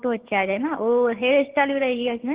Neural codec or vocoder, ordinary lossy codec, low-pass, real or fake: codec, 16 kHz, 6 kbps, DAC; Opus, 16 kbps; 3.6 kHz; fake